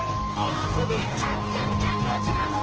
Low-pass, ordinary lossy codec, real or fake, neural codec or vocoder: 7.2 kHz; Opus, 16 kbps; fake; codec, 44.1 kHz, 2.6 kbps, DAC